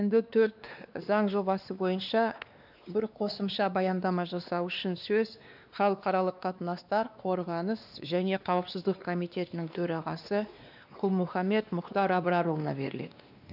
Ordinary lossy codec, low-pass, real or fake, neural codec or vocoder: none; 5.4 kHz; fake; codec, 16 kHz, 2 kbps, X-Codec, WavLM features, trained on Multilingual LibriSpeech